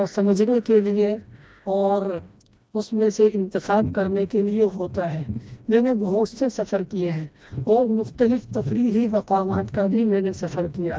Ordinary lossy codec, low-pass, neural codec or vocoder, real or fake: none; none; codec, 16 kHz, 1 kbps, FreqCodec, smaller model; fake